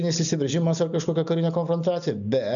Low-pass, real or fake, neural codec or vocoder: 7.2 kHz; real; none